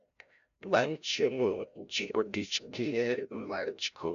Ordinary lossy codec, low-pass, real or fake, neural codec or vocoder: none; 7.2 kHz; fake; codec, 16 kHz, 0.5 kbps, FreqCodec, larger model